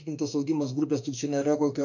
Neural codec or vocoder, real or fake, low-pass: autoencoder, 48 kHz, 32 numbers a frame, DAC-VAE, trained on Japanese speech; fake; 7.2 kHz